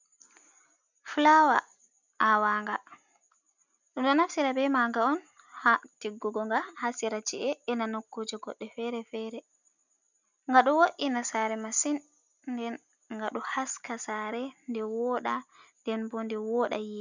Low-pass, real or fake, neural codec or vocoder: 7.2 kHz; real; none